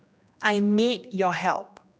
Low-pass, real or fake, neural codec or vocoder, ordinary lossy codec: none; fake; codec, 16 kHz, 1 kbps, X-Codec, HuBERT features, trained on general audio; none